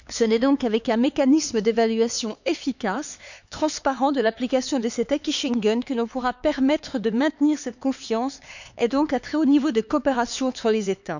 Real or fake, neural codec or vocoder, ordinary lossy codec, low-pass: fake; codec, 16 kHz, 4 kbps, X-Codec, HuBERT features, trained on LibriSpeech; none; 7.2 kHz